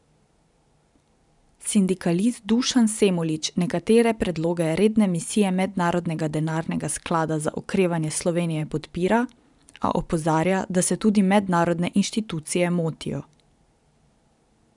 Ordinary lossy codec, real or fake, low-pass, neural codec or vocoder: none; fake; 10.8 kHz; vocoder, 44.1 kHz, 128 mel bands every 512 samples, BigVGAN v2